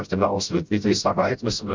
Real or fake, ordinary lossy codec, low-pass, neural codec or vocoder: fake; MP3, 64 kbps; 7.2 kHz; codec, 16 kHz, 0.5 kbps, FreqCodec, smaller model